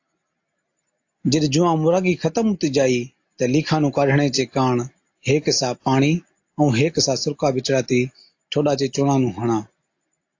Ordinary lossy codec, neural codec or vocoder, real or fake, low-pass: AAC, 48 kbps; none; real; 7.2 kHz